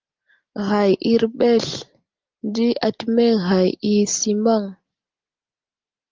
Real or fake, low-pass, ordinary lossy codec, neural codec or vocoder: real; 7.2 kHz; Opus, 32 kbps; none